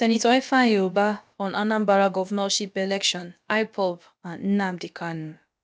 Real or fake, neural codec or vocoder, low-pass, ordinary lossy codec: fake; codec, 16 kHz, about 1 kbps, DyCAST, with the encoder's durations; none; none